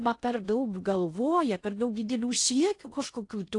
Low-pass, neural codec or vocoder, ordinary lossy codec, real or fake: 10.8 kHz; codec, 16 kHz in and 24 kHz out, 0.6 kbps, FocalCodec, streaming, 2048 codes; AAC, 48 kbps; fake